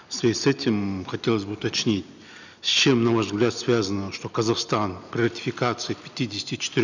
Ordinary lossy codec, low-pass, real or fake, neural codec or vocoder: none; 7.2 kHz; real; none